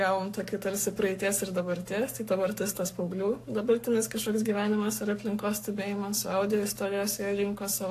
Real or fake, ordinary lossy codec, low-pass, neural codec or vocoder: fake; AAC, 64 kbps; 14.4 kHz; codec, 44.1 kHz, 7.8 kbps, Pupu-Codec